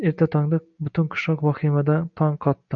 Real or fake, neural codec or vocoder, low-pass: real; none; 7.2 kHz